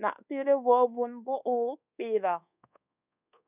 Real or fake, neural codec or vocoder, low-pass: fake; codec, 24 kHz, 1.2 kbps, DualCodec; 3.6 kHz